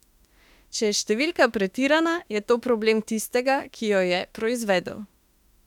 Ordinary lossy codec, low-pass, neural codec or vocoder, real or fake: none; 19.8 kHz; autoencoder, 48 kHz, 32 numbers a frame, DAC-VAE, trained on Japanese speech; fake